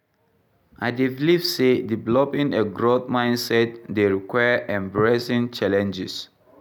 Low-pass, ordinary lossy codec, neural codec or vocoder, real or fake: none; none; none; real